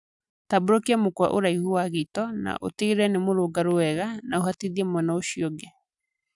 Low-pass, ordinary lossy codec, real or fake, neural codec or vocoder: 10.8 kHz; none; real; none